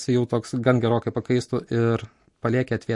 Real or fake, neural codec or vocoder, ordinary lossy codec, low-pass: real; none; MP3, 48 kbps; 10.8 kHz